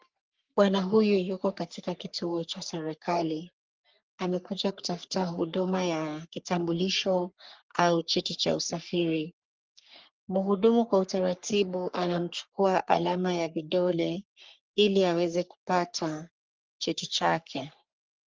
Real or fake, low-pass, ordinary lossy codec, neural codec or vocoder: fake; 7.2 kHz; Opus, 32 kbps; codec, 44.1 kHz, 3.4 kbps, Pupu-Codec